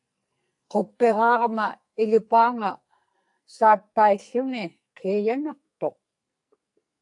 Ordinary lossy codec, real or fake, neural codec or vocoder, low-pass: AAC, 64 kbps; fake; codec, 44.1 kHz, 2.6 kbps, SNAC; 10.8 kHz